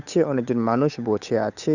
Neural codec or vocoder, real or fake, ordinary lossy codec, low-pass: codec, 16 kHz, 8 kbps, FunCodec, trained on Chinese and English, 25 frames a second; fake; none; 7.2 kHz